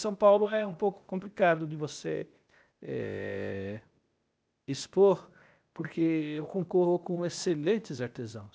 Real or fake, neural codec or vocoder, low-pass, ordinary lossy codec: fake; codec, 16 kHz, 0.8 kbps, ZipCodec; none; none